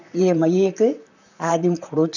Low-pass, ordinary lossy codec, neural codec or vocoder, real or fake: 7.2 kHz; none; vocoder, 44.1 kHz, 128 mel bands, Pupu-Vocoder; fake